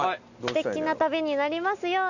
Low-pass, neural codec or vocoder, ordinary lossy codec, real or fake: 7.2 kHz; none; none; real